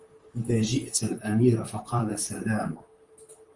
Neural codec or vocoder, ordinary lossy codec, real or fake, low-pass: vocoder, 44.1 kHz, 128 mel bands, Pupu-Vocoder; Opus, 32 kbps; fake; 10.8 kHz